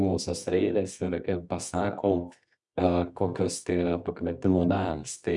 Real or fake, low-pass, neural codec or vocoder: fake; 10.8 kHz; codec, 24 kHz, 0.9 kbps, WavTokenizer, medium music audio release